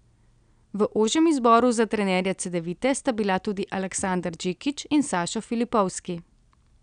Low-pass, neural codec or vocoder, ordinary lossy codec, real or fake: 9.9 kHz; none; none; real